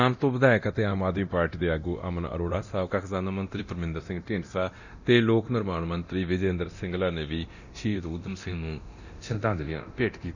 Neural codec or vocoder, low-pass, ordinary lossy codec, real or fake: codec, 24 kHz, 0.9 kbps, DualCodec; 7.2 kHz; none; fake